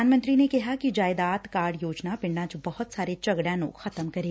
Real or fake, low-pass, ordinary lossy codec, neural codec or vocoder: real; none; none; none